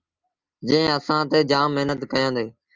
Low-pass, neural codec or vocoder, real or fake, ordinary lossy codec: 7.2 kHz; none; real; Opus, 24 kbps